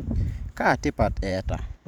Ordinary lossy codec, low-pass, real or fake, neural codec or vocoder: none; 19.8 kHz; fake; vocoder, 44.1 kHz, 128 mel bands every 512 samples, BigVGAN v2